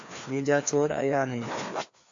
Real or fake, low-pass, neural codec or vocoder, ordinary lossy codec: fake; 7.2 kHz; codec, 16 kHz, 2 kbps, FreqCodec, larger model; AAC, 48 kbps